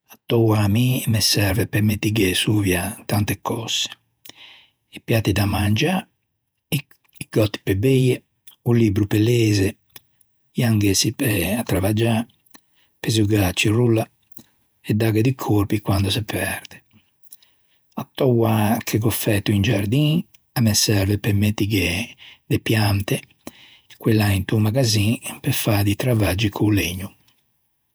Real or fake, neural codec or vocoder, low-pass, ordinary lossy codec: fake; vocoder, 48 kHz, 128 mel bands, Vocos; none; none